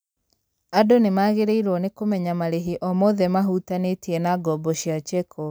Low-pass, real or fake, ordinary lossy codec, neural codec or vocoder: none; real; none; none